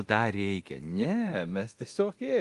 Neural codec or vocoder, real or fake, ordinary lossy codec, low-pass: codec, 24 kHz, 0.9 kbps, DualCodec; fake; Opus, 24 kbps; 10.8 kHz